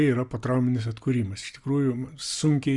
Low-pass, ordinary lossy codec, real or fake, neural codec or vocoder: 10.8 kHz; Opus, 64 kbps; real; none